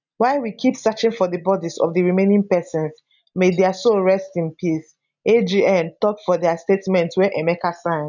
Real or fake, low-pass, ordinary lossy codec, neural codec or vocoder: real; 7.2 kHz; none; none